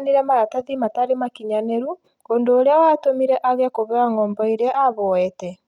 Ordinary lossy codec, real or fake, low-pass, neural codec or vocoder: none; real; 19.8 kHz; none